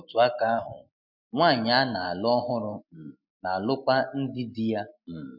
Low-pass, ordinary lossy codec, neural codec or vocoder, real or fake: 5.4 kHz; none; none; real